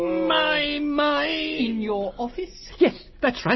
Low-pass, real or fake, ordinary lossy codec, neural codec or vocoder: 7.2 kHz; real; MP3, 24 kbps; none